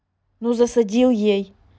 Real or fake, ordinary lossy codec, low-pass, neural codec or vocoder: real; none; none; none